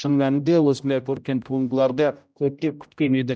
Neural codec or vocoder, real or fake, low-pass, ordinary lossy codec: codec, 16 kHz, 0.5 kbps, X-Codec, HuBERT features, trained on general audio; fake; none; none